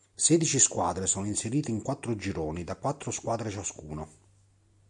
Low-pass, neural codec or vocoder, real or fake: 10.8 kHz; none; real